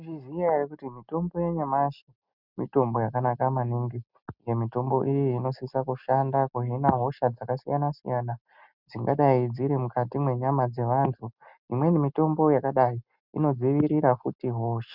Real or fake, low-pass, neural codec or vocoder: real; 5.4 kHz; none